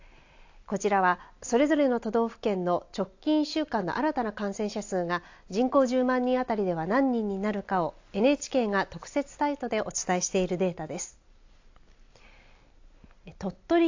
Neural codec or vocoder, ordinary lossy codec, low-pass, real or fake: none; AAC, 48 kbps; 7.2 kHz; real